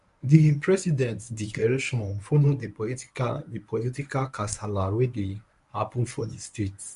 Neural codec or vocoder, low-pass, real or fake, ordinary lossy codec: codec, 24 kHz, 0.9 kbps, WavTokenizer, medium speech release version 1; 10.8 kHz; fake; none